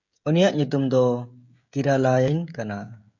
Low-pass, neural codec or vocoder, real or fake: 7.2 kHz; codec, 16 kHz, 16 kbps, FreqCodec, smaller model; fake